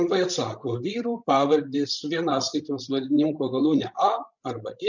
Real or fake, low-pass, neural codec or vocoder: fake; 7.2 kHz; codec, 16 kHz, 16 kbps, FreqCodec, larger model